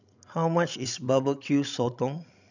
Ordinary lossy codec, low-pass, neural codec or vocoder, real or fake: none; 7.2 kHz; codec, 16 kHz, 16 kbps, FreqCodec, larger model; fake